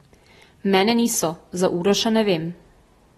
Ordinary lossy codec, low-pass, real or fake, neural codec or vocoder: AAC, 32 kbps; 14.4 kHz; real; none